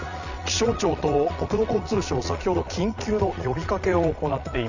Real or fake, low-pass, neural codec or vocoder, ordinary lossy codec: fake; 7.2 kHz; vocoder, 22.05 kHz, 80 mel bands, Vocos; none